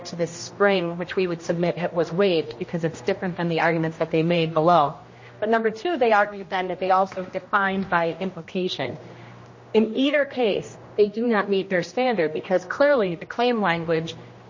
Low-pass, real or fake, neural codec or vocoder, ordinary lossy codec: 7.2 kHz; fake; codec, 16 kHz, 1 kbps, X-Codec, HuBERT features, trained on general audio; MP3, 32 kbps